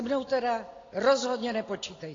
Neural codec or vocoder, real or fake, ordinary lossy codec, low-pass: none; real; AAC, 32 kbps; 7.2 kHz